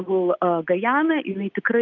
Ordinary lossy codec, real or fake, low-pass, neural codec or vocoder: Opus, 32 kbps; real; 7.2 kHz; none